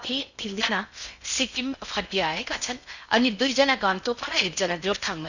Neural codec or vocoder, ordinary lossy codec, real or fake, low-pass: codec, 16 kHz in and 24 kHz out, 0.6 kbps, FocalCodec, streaming, 2048 codes; none; fake; 7.2 kHz